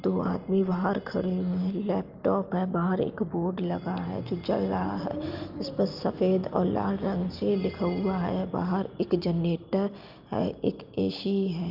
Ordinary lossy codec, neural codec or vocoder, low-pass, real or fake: Opus, 24 kbps; none; 5.4 kHz; real